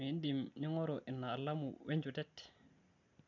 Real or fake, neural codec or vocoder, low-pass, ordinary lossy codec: real; none; 7.2 kHz; none